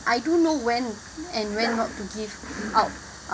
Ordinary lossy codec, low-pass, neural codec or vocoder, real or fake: none; none; none; real